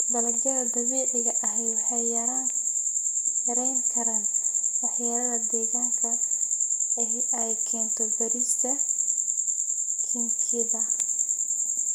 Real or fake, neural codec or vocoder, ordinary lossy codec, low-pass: real; none; none; none